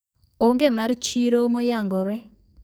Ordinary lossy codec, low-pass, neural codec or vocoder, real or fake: none; none; codec, 44.1 kHz, 2.6 kbps, SNAC; fake